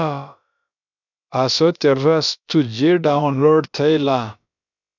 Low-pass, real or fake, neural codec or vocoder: 7.2 kHz; fake; codec, 16 kHz, about 1 kbps, DyCAST, with the encoder's durations